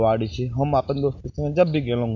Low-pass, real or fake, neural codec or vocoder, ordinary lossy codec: 7.2 kHz; real; none; none